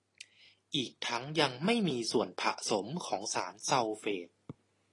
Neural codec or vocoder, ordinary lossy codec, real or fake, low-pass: none; AAC, 32 kbps; real; 10.8 kHz